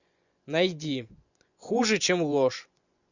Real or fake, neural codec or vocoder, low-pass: fake; vocoder, 24 kHz, 100 mel bands, Vocos; 7.2 kHz